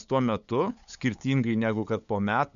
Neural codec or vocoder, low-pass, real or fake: codec, 16 kHz, 8 kbps, FunCodec, trained on LibriTTS, 25 frames a second; 7.2 kHz; fake